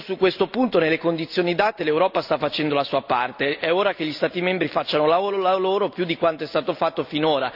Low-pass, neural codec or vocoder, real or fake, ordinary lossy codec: 5.4 kHz; none; real; none